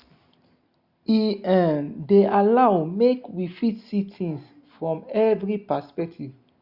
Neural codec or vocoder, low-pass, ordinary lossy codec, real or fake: none; 5.4 kHz; Opus, 64 kbps; real